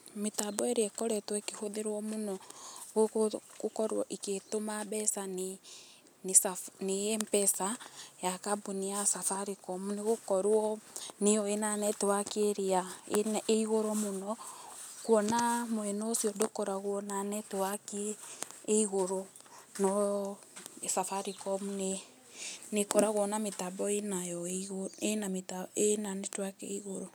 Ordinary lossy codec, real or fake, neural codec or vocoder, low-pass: none; real; none; none